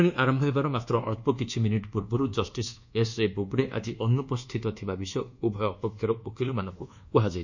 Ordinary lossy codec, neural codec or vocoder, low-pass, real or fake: none; codec, 24 kHz, 1.2 kbps, DualCodec; 7.2 kHz; fake